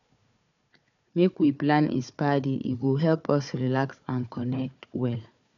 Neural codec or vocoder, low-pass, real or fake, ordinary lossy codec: codec, 16 kHz, 4 kbps, FunCodec, trained on Chinese and English, 50 frames a second; 7.2 kHz; fake; none